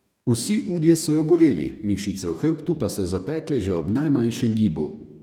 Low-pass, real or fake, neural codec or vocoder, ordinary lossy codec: 19.8 kHz; fake; codec, 44.1 kHz, 2.6 kbps, DAC; none